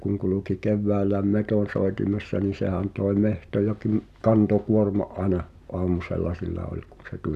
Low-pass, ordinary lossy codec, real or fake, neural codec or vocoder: 14.4 kHz; none; real; none